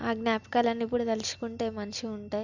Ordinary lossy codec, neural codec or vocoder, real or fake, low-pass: none; none; real; 7.2 kHz